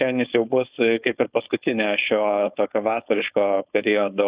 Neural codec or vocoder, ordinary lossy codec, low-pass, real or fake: codec, 16 kHz, 4.8 kbps, FACodec; Opus, 64 kbps; 3.6 kHz; fake